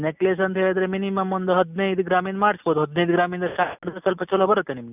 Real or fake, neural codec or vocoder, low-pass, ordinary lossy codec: real; none; 3.6 kHz; none